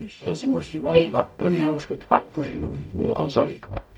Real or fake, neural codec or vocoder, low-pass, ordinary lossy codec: fake; codec, 44.1 kHz, 0.9 kbps, DAC; 19.8 kHz; none